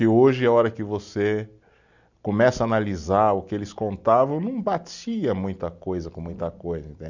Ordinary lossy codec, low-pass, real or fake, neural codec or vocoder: none; 7.2 kHz; real; none